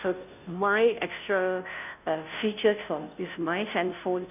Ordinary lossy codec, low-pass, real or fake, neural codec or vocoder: none; 3.6 kHz; fake; codec, 16 kHz, 0.5 kbps, FunCodec, trained on Chinese and English, 25 frames a second